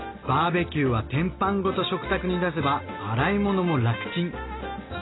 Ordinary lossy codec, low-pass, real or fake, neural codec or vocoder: AAC, 16 kbps; 7.2 kHz; real; none